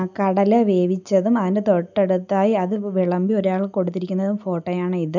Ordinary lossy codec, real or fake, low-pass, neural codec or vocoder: none; real; 7.2 kHz; none